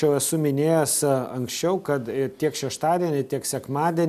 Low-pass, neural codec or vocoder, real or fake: 14.4 kHz; none; real